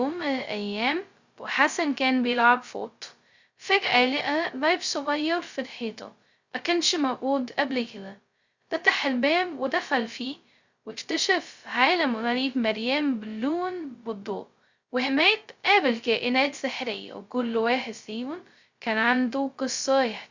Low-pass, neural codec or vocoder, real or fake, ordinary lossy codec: 7.2 kHz; codec, 16 kHz, 0.2 kbps, FocalCodec; fake; Opus, 64 kbps